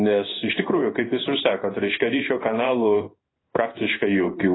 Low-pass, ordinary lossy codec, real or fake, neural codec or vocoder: 7.2 kHz; AAC, 16 kbps; fake; codec, 16 kHz in and 24 kHz out, 1 kbps, XY-Tokenizer